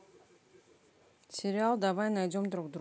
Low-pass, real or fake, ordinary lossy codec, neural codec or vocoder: none; real; none; none